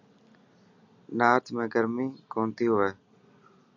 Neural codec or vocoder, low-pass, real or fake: none; 7.2 kHz; real